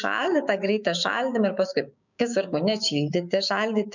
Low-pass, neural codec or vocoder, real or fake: 7.2 kHz; codec, 16 kHz, 6 kbps, DAC; fake